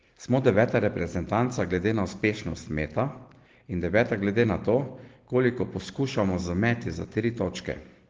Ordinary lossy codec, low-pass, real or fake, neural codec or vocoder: Opus, 16 kbps; 7.2 kHz; real; none